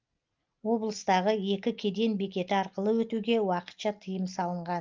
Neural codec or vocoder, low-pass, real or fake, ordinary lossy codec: none; 7.2 kHz; real; Opus, 24 kbps